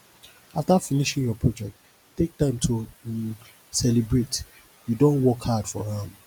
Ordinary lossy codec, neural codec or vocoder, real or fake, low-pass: none; none; real; none